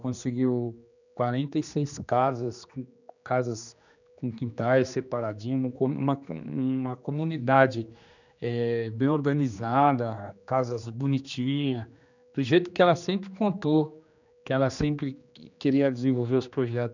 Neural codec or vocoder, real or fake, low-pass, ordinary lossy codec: codec, 16 kHz, 2 kbps, X-Codec, HuBERT features, trained on general audio; fake; 7.2 kHz; none